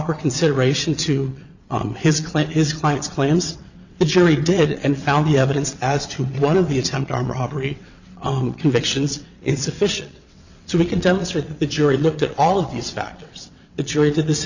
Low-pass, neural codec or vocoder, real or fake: 7.2 kHz; vocoder, 22.05 kHz, 80 mel bands, WaveNeXt; fake